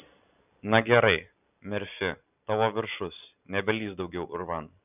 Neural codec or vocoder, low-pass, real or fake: none; 3.6 kHz; real